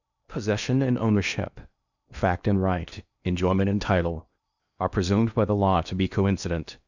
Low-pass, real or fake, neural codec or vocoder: 7.2 kHz; fake; codec, 16 kHz in and 24 kHz out, 0.8 kbps, FocalCodec, streaming, 65536 codes